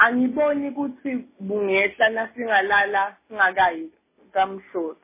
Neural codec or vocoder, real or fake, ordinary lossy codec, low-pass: none; real; MP3, 16 kbps; 3.6 kHz